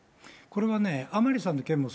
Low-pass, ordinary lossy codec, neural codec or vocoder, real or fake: none; none; none; real